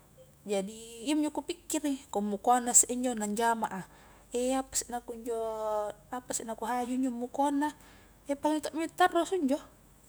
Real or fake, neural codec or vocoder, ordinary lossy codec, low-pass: fake; autoencoder, 48 kHz, 128 numbers a frame, DAC-VAE, trained on Japanese speech; none; none